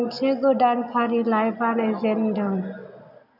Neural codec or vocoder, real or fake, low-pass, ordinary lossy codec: none; real; 5.4 kHz; none